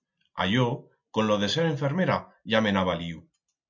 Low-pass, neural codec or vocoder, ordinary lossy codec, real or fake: 7.2 kHz; none; MP3, 64 kbps; real